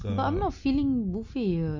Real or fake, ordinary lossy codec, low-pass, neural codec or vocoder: real; MP3, 48 kbps; 7.2 kHz; none